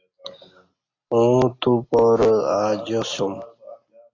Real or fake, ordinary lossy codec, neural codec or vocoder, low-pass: real; AAC, 32 kbps; none; 7.2 kHz